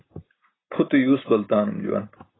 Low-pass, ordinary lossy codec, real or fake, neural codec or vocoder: 7.2 kHz; AAC, 16 kbps; real; none